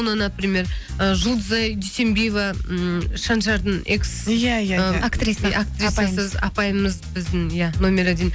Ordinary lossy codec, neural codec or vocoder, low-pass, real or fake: none; none; none; real